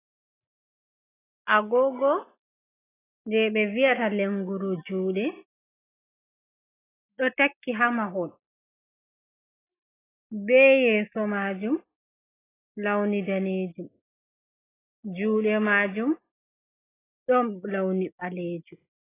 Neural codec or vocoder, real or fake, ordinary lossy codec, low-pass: none; real; AAC, 16 kbps; 3.6 kHz